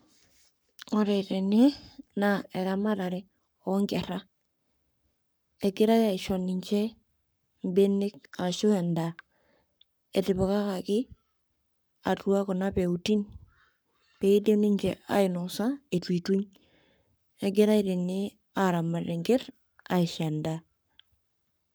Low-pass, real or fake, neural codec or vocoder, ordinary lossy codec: none; fake; codec, 44.1 kHz, 7.8 kbps, Pupu-Codec; none